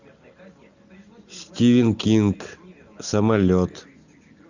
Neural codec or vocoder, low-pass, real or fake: none; 7.2 kHz; real